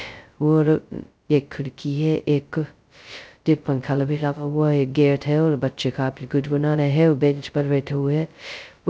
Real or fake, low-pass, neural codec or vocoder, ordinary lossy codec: fake; none; codec, 16 kHz, 0.2 kbps, FocalCodec; none